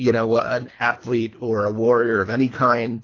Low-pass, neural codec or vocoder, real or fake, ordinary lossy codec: 7.2 kHz; codec, 24 kHz, 3 kbps, HILCodec; fake; AAC, 32 kbps